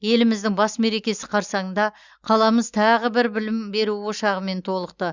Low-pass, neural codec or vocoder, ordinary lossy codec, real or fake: 7.2 kHz; none; Opus, 64 kbps; real